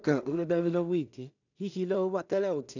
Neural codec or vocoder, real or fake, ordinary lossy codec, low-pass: codec, 16 kHz in and 24 kHz out, 0.4 kbps, LongCat-Audio-Codec, two codebook decoder; fake; none; 7.2 kHz